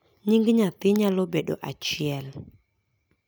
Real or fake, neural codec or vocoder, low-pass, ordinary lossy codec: real; none; none; none